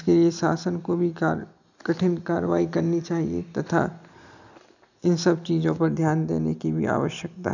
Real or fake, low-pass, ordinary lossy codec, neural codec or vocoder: real; 7.2 kHz; none; none